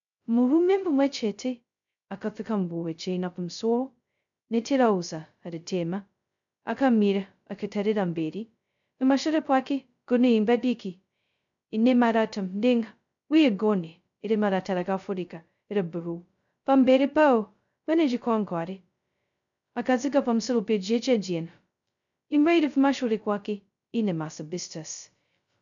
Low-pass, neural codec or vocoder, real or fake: 7.2 kHz; codec, 16 kHz, 0.2 kbps, FocalCodec; fake